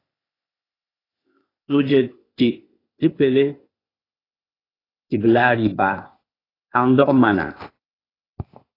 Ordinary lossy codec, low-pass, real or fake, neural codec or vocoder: AAC, 24 kbps; 5.4 kHz; fake; codec, 16 kHz, 0.8 kbps, ZipCodec